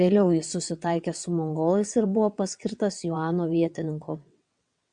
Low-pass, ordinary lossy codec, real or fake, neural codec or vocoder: 9.9 kHz; MP3, 96 kbps; fake; vocoder, 22.05 kHz, 80 mel bands, WaveNeXt